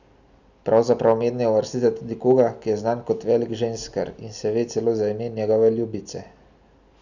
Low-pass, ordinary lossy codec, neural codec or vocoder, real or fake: 7.2 kHz; none; none; real